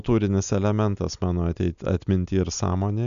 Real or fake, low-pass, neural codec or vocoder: real; 7.2 kHz; none